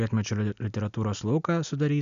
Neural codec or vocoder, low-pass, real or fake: none; 7.2 kHz; real